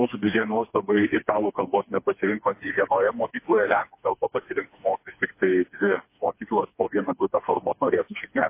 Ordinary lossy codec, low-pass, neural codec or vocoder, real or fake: MP3, 24 kbps; 3.6 kHz; codec, 16 kHz, 2 kbps, FreqCodec, smaller model; fake